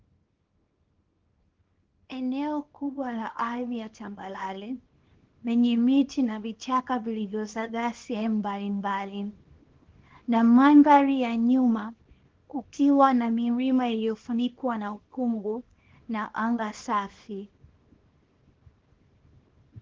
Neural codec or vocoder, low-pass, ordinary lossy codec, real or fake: codec, 24 kHz, 0.9 kbps, WavTokenizer, small release; 7.2 kHz; Opus, 16 kbps; fake